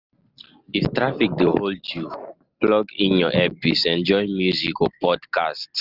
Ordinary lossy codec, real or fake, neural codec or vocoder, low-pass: Opus, 24 kbps; real; none; 5.4 kHz